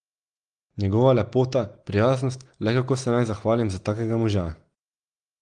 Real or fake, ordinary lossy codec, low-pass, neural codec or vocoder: real; Opus, 24 kbps; 9.9 kHz; none